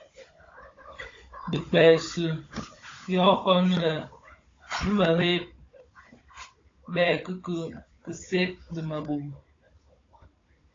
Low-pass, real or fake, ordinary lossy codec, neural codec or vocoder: 7.2 kHz; fake; AAC, 32 kbps; codec, 16 kHz, 16 kbps, FunCodec, trained on Chinese and English, 50 frames a second